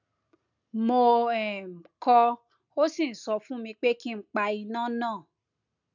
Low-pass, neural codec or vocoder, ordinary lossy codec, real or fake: 7.2 kHz; none; none; real